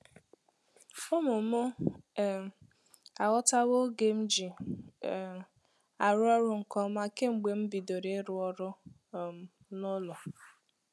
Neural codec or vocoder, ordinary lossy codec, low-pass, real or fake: none; none; none; real